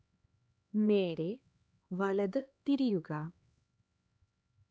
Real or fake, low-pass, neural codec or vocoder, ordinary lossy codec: fake; none; codec, 16 kHz, 2 kbps, X-Codec, HuBERT features, trained on LibriSpeech; none